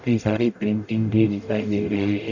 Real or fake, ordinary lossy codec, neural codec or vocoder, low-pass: fake; none; codec, 44.1 kHz, 0.9 kbps, DAC; 7.2 kHz